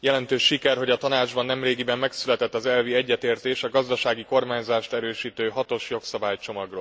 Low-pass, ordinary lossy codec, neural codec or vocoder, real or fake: none; none; none; real